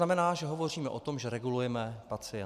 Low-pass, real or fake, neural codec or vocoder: 14.4 kHz; real; none